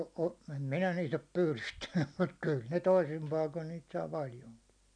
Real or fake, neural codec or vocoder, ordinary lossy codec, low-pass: real; none; none; 9.9 kHz